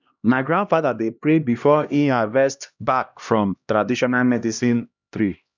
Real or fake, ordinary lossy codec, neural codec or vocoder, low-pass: fake; none; codec, 16 kHz, 1 kbps, X-Codec, HuBERT features, trained on LibriSpeech; 7.2 kHz